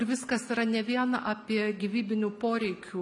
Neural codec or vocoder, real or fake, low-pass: none; real; 10.8 kHz